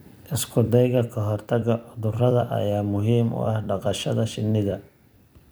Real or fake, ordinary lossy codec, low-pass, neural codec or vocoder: fake; none; none; vocoder, 44.1 kHz, 128 mel bands every 256 samples, BigVGAN v2